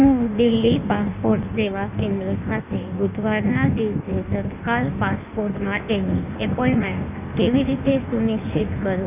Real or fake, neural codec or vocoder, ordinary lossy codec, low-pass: fake; codec, 16 kHz in and 24 kHz out, 1.1 kbps, FireRedTTS-2 codec; none; 3.6 kHz